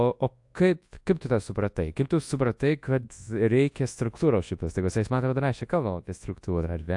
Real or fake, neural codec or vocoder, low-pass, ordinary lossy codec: fake; codec, 24 kHz, 0.9 kbps, WavTokenizer, large speech release; 10.8 kHz; AAC, 64 kbps